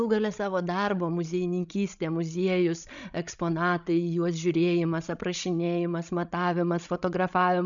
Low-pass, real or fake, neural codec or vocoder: 7.2 kHz; fake; codec, 16 kHz, 16 kbps, FreqCodec, larger model